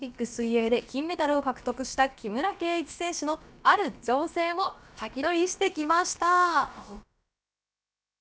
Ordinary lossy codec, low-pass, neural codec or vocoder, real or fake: none; none; codec, 16 kHz, about 1 kbps, DyCAST, with the encoder's durations; fake